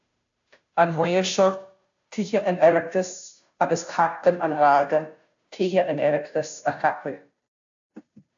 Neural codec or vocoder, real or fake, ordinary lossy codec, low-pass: codec, 16 kHz, 0.5 kbps, FunCodec, trained on Chinese and English, 25 frames a second; fake; AAC, 64 kbps; 7.2 kHz